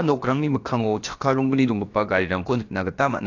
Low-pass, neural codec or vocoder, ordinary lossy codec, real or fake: 7.2 kHz; codec, 16 kHz, about 1 kbps, DyCAST, with the encoder's durations; AAC, 48 kbps; fake